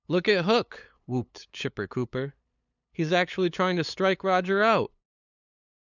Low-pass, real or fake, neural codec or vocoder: 7.2 kHz; fake; codec, 16 kHz, 8 kbps, FunCodec, trained on LibriTTS, 25 frames a second